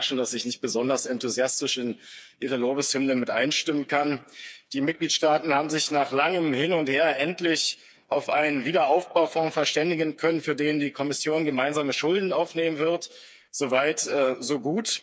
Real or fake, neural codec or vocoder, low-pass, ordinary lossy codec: fake; codec, 16 kHz, 4 kbps, FreqCodec, smaller model; none; none